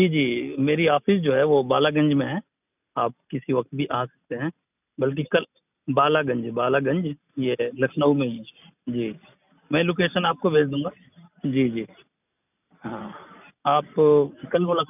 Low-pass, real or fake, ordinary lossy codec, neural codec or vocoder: 3.6 kHz; real; none; none